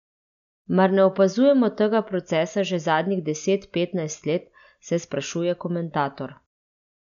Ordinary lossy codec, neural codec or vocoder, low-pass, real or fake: none; none; 7.2 kHz; real